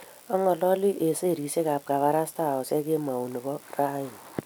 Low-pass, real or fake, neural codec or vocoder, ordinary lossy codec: none; real; none; none